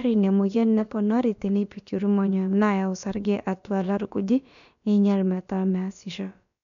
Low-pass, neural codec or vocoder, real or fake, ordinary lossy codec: 7.2 kHz; codec, 16 kHz, about 1 kbps, DyCAST, with the encoder's durations; fake; none